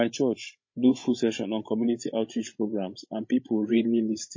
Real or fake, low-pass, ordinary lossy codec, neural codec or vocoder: fake; 7.2 kHz; MP3, 32 kbps; codec, 16 kHz, 16 kbps, FreqCodec, larger model